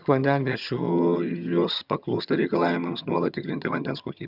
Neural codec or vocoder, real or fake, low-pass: vocoder, 22.05 kHz, 80 mel bands, HiFi-GAN; fake; 5.4 kHz